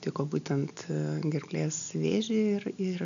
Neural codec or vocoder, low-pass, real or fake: none; 7.2 kHz; real